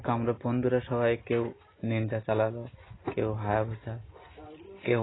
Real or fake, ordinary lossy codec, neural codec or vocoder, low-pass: real; AAC, 16 kbps; none; 7.2 kHz